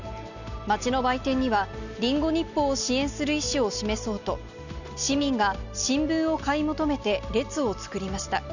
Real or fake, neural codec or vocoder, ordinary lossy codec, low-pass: real; none; none; 7.2 kHz